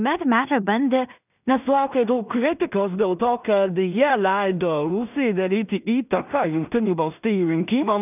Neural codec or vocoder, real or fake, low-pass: codec, 16 kHz in and 24 kHz out, 0.4 kbps, LongCat-Audio-Codec, two codebook decoder; fake; 3.6 kHz